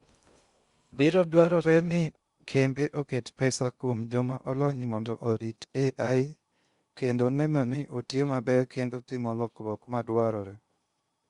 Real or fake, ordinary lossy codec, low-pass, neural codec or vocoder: fake; Opus, 64 kbps; 10.8 kHz; codec, 16 kHz in and 24 kHz out, 0.6 kbps, FocalCodec, streaming, 2048 codes